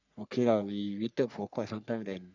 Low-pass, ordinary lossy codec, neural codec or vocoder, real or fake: 7.2 kHz; none; codec, 44.1 kHz, 3.4 kbps, Pupu-Codec; fake